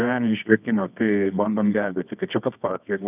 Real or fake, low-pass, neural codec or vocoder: fake; 3.6 kHz; codec, 24 kHz, 0.9 kbps, WavTokenizer, medium music audio release